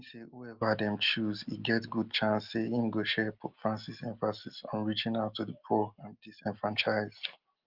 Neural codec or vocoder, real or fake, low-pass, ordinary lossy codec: none; real; 5.4 kHz; Opus, 24 kbps